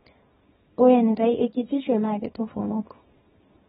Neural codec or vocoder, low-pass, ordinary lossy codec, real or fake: codec, 24 kHz, 0.9 kbps, WavTokenizer, small release; 10.8 kHz; AAC, 16 kbps; fake